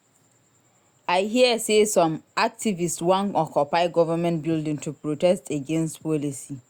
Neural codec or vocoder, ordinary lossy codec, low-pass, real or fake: none; Opus, 64 kbps; 19.8 kHz; real